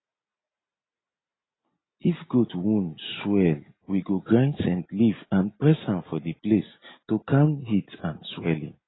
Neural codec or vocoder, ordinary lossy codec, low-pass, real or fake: none; AAC, 16 kbps; 7.2 kHz; real